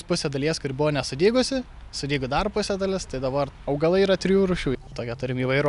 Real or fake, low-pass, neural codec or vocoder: real; 10.8 kHz; none